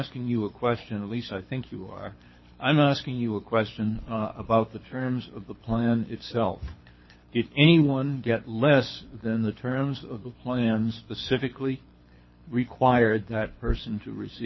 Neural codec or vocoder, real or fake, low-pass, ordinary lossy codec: codec, 24 kHz, 6 kbps, HILCodec; fake; 7.2 kHz; MP3, 24 kbps